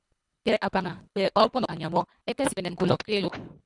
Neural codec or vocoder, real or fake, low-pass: codec, 24 kHz, 3 kbps, HILCodec; fake; 10.8 kHz